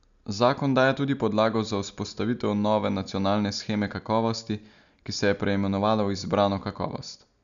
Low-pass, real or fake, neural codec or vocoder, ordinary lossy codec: 7.2 kHz; real; none; none